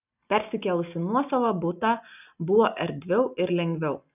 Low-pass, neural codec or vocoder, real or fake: 3.6 kHz; none; real